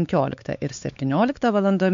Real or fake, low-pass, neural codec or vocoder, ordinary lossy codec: fake; 7.2 kHz; codec, 16 kHz, 4 kbps, X-Codec, WavLM features, trained on Multilingual LibriSpeech; MP3, 64 kbps